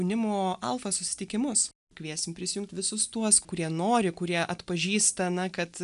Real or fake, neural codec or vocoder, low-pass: real; none; 10.8 kHz